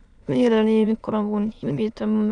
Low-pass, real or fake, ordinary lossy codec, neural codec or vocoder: 9.9 kHz; fake; none; autoencoder, 22.05 kHz, a latent of 192 numbers a frame, VITS, trained on many speakers